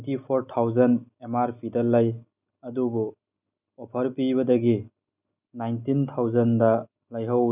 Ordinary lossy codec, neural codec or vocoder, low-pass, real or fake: none; none; 3.6 kHz; real